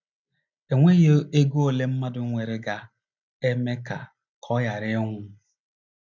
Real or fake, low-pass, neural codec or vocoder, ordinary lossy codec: real; 7.2 kHz; none; none